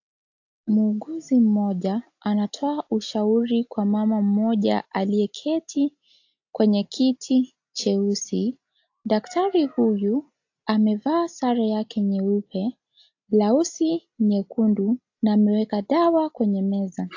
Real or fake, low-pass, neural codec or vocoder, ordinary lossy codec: real; 7.2 kHz; none; AAC, 48 kbps